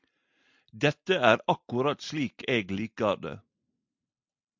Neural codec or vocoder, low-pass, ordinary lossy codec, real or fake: none; 7.2 kHz; MP3, 64 kbps; real